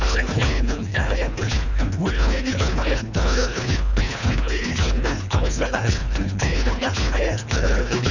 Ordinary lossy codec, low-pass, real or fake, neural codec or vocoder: none; 7.2 kHz; fake; codec, 24 kHz, 1.5 kbps, HILCodec